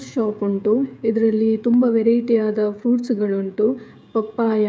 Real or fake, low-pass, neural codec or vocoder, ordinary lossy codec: fake; none; codec, 16 kHz, 16 kbps, FreqCodec, smaller model; none